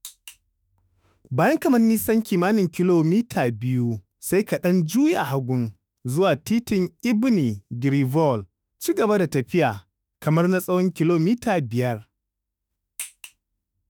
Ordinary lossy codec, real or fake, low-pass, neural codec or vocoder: none; fake; none; autoencoder, 48 kHz, 32 numbers a frame, DAC-VAE, trained on Japanese speech